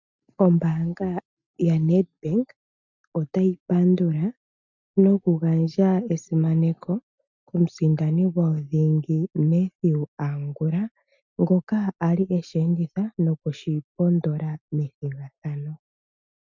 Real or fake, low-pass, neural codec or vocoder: real; 7.2 kHz; none